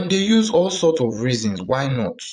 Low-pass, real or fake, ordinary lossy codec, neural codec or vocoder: 9.9 kHz; fake; none; vocoder, 22.05 kHz, 80 mel bands, Vocos